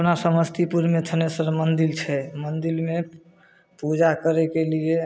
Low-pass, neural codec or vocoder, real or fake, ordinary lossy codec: none; none; real; none